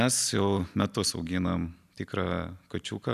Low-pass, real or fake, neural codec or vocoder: 14.4 kHz; real; none